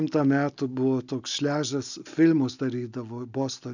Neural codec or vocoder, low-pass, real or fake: none; 7.2 kHz; real